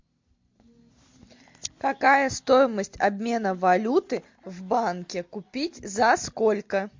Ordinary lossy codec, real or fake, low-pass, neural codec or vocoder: MP3, 48 kbps; real; 7.2 kHz; none